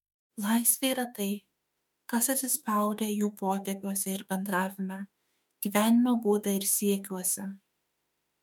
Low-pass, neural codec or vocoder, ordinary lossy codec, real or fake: 19.8 kHz; autoencoder, 48 kHz, 32 numbers a frame, DAC-VAE, trained on Japanese speech; MP3, 96 kbps; fake